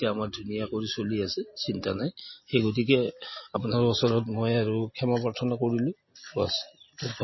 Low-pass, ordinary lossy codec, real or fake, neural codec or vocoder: 7.2 kHz; MP3, 24 kbps; real; none